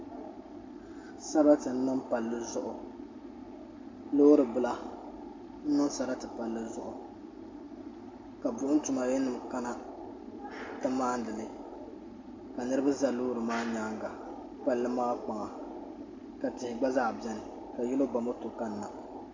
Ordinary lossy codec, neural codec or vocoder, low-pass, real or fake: MP3, 48 kbps; none; 7.2 kHz; real